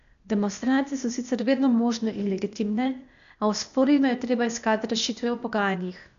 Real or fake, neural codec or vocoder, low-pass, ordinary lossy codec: fake; codec, 16 kHz, 0.8 kbps, ZipCodec; 7.2 kHz; none